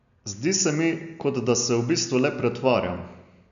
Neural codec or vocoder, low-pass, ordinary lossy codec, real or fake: none; 7.2 kHz; MP3, 96 kbps; real